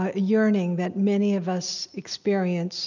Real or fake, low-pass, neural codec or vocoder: real; 7.2 kHz; none